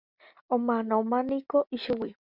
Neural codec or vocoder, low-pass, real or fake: none; 5.4 kHz; real